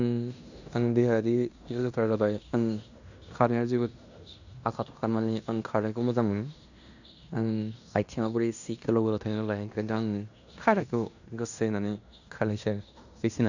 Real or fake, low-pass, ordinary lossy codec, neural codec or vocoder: fake; 7.2 kHz; none; codec, 16 kHz in and 24 kHz out, 0.9 kbps, LongCat-Audio-Codec, fine tuned four codebook decoder